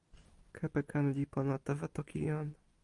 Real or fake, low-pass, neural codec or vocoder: fake; 10.8 kHz; vocoder, 44.1 kHz, 128 mel bands every 256 samples, BigVGAN v2